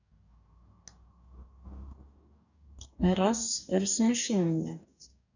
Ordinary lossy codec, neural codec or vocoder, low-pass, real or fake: none; codec, 44.1 kHz, 2.6 kbps, DAC; 7.2 kHz; fake